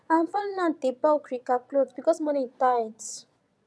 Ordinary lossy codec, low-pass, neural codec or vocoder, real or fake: none; none; vocoder, 22.05 kHz, 80 mel bands, Vocos; fake